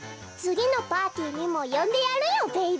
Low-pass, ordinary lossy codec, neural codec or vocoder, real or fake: none; none; none; real